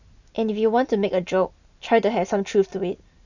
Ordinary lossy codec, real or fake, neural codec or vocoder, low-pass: none; real; none; 7.2 kHz